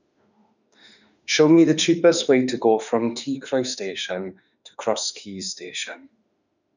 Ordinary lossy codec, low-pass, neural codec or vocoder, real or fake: none; 7.2 kHz; autoencoder, 48 kHz, 32 numbers a frame, DAC-VAE, trained on Japanese speech; fake